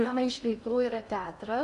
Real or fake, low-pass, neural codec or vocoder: fake; 10.8 kHz; codec, 16 kHz in and 24 kHz out, 0.8 kbps, FocalCodec, streaming, 65536 codes